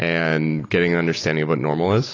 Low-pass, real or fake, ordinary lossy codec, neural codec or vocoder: 7.2 kHz; real; AAC, 32 kbps; none